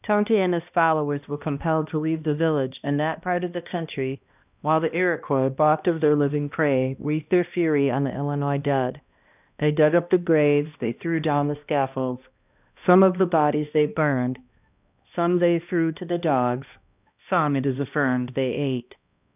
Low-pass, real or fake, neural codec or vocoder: 3.6 kHz; fake; codec, 16 kHz, 1 kbps, X-Codec, HuBERT features, trained on balanced general audio